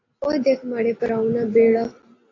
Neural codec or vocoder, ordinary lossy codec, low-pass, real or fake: none; AAC, 32 kbps; 7.2 kHz; real